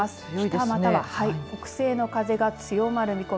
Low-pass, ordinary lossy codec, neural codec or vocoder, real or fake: none; none; none; real